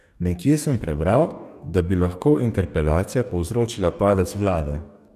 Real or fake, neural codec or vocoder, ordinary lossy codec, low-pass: fake; codec, 44.1 kHz, 2.6 kbps, DAC; none; 14.4 kHz